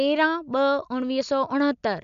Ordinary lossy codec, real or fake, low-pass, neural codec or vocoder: none; real; 7.2 kHz; none